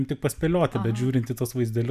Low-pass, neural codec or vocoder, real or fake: 14.4 kHz; none; real